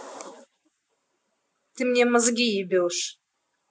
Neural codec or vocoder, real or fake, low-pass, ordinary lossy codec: none; real; none; none